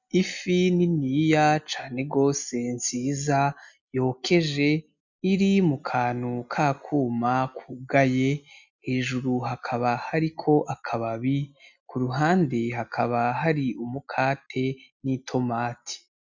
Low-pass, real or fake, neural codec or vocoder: 7.2 kHz; real; none